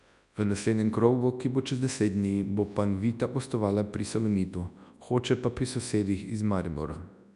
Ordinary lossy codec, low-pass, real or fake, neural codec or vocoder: none; 10.8 kHz; fake; codec, 24 kHz, 0.9 kbps, WavTokenizer, large speech release